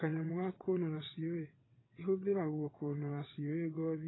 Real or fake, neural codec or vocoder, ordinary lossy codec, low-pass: fake; vocoder, 22.05 kHz, 80 mel bands, WaveNeXt; AAC, 16 kbps; 7.2 kHz